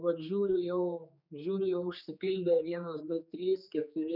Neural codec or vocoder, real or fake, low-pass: codec, 16 kHz, 4 kbps, X-Codec, HuBERT features, trained on general audio; fake; 5.4 kHz